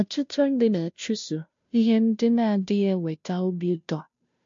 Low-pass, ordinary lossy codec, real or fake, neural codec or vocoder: 7.2 kHz; MP3, 48 kbps; fake; codec, 16 kHz, 0.5 kbps, FunCodec, trained on Chinese and English, 25 frames a second